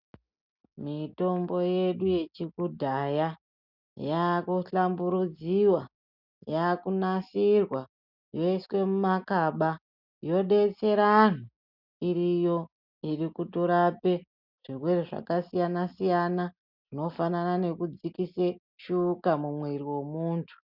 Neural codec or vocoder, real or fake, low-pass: none; real; 5.4 kHz